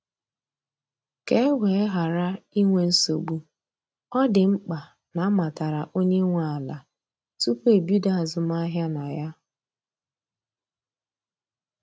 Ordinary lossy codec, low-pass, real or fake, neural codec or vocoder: none; none; real; none